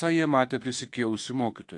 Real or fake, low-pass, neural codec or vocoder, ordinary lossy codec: fake; 10.8 kHz; autoencoder, 48 kHz, 32 numbers a frame, DAC-VAE, trained on Japanese speech; AAC, 48 kbps